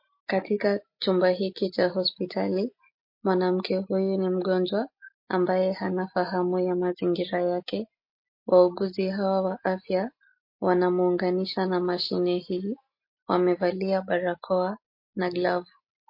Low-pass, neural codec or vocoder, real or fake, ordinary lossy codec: 5.4 kHz; none; real; MP3, 32 kbps